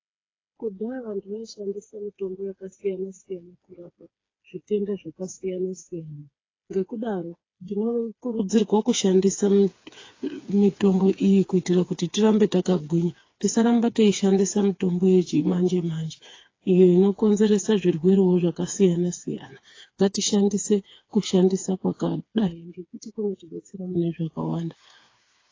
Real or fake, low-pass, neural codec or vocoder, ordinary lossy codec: fake; 7.2 kHz; codec, 16 kHz, 4 kbps, FreqCodec, smaller model; AAC, 32 kbps